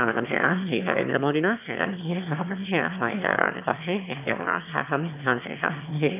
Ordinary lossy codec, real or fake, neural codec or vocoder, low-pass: none; fake; autoencoder, 22.05 kHz, a latent of 192 numbers a frame, VITS, trained on one speaker; 3.6 kHz